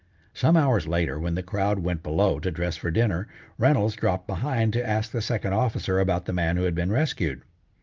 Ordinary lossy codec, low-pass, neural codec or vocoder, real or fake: Opus, 24 kbps; 7.2 kHz; none; real